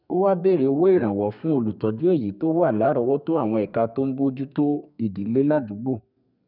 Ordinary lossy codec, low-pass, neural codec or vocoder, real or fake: none; 5.4 kHz; codec, 32 kHz, 1.9 kbps, SNAC; fake